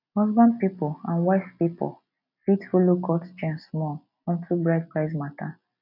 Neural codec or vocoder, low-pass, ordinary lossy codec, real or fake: none; 5.4 kHz; none; real